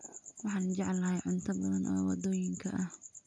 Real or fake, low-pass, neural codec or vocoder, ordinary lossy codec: real; 9.9 kHz; none; none